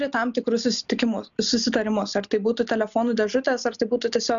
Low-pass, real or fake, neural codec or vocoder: 7.2 kHz; real; none